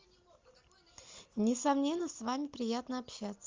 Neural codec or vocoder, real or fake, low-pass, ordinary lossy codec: none; real; 7.2 kHz; Opus, 32 kbps